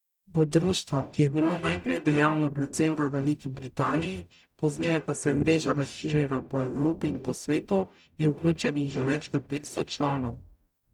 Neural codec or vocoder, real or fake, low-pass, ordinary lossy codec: codec, 44.1 kHz, 0.9 kbps, DAC; fake; 19.8 kHz; none